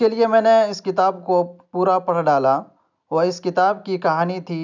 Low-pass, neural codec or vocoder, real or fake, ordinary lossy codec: 7.2 kHz; none; real; none